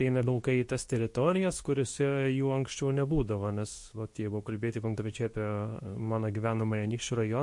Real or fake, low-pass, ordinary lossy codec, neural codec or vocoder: fake; 10.8 kHz; MP3, 48 kbps; codec, 24 kHz, 0.9 kbps, WavTokenizer, medium speech release version 2